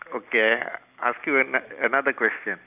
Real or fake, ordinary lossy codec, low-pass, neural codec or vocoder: real; none; 3.6 kHz; none